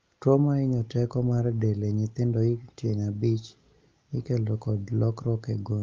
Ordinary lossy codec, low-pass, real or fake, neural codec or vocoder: Opus, 24 kbps; 7.2 kHz; real; none